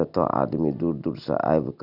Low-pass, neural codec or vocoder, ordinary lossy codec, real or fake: 5.4 kHz; none; none; real